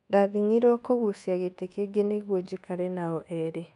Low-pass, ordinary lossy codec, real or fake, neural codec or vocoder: 10.8 kHz; none; fake; codec, 24 kHz, 1.2 kbps, DualCodec